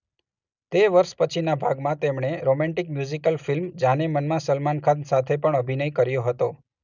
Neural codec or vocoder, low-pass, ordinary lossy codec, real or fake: none; 7.2 kHz; none; real